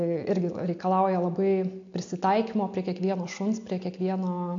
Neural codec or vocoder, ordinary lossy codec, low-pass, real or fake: none; AAC, 48 kbps; 7.2 kHz; real